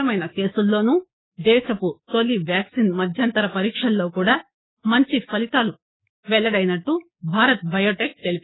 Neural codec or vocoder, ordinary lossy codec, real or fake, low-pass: codec, 16 kHz, 6 kbps, DAC; AAC, 16 kbps; fake; 7.2 kHz